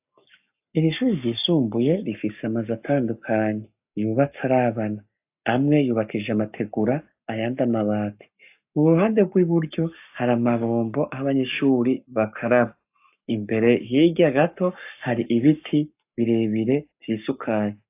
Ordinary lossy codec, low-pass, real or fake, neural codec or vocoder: AAC, 32 kbps; 3.6 kHz; fake; codec, 44.1 kHz, 7.8 kbps, Pupu-Codec